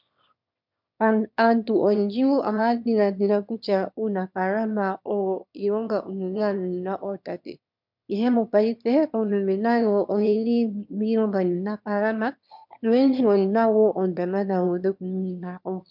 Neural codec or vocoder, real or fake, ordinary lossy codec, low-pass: autoencoder, 22.05 kHz, a latent of 192 numbers a frame, VITS, trained on one speaker; fake; MP3, 48 kbps; 5.4 kHz